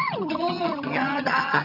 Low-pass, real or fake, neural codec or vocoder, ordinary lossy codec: 5.4 kHz; fake; vocoder, 22.05 kHz, 80 mel bands, HiFi-GAN; none